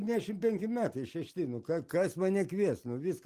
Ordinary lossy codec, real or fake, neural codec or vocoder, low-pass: Opus, 24 kbps; real; none; 14.4 kHz